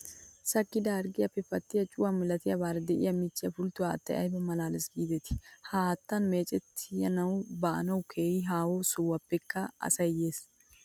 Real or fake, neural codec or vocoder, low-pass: real; none; 19.8 kHz